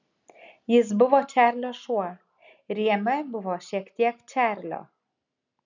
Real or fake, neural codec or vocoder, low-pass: real; none; 7.2 kHz